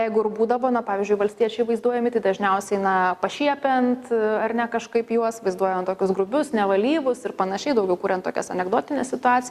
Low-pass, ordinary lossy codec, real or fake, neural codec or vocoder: 14.4 kHz; Opus, 64 kbps; real; none